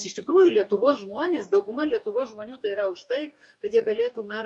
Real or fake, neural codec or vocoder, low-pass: fake; codec, 44.1 kHz, 2.6 kbps, DAC; 10.8 kHz